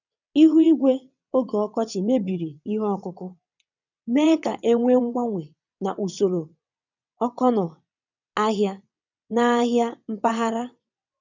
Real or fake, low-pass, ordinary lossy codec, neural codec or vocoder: fake; 7.2 kHz; none; vocoder, 22.05 kHz, 80 mel bands, WaveNeXt